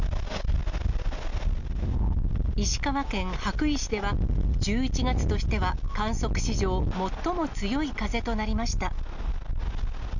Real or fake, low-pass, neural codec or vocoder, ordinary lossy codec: real; 7.2 kHz; none; none